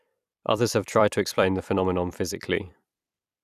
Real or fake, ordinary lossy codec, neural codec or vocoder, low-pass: fake; none; vocoder, 44.1 kHz, 128 mel bands every 256 samples, BigVGAN v2; 14.4 kHz